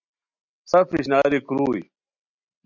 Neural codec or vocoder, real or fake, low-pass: none; real; 7.2 kHz